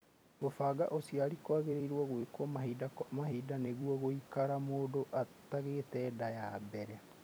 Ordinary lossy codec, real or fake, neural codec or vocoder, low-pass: none; fake; vocoder, 44.1 kHz, 128 mel bands every 256 samples, BigVGAN v2; none